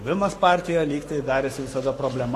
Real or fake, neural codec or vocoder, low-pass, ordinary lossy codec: fake; codec, 44.1 kHz, 7.8 kbps, Pupu-Codec; 14.4 kHz; AAC, 48 kbps